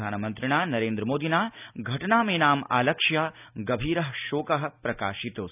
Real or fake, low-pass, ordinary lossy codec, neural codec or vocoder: real; 3.6 kHz; none; none